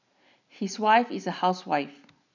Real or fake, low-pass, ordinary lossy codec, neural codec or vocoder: real; 7.2 kHz; none; none